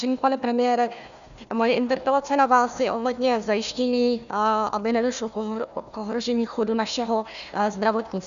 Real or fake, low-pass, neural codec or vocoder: fake; 7.2 kHz; codec, 16 kHz, 1 kbps, FunCodec, trained on Chinese and English, 50 frames a second